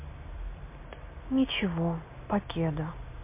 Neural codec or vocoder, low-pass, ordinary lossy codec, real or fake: none; 3.6 kHz; MP3, 24 kbps; real